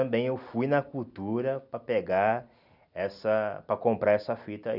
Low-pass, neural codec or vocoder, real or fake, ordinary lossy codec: 5.4 kHz; none; real; none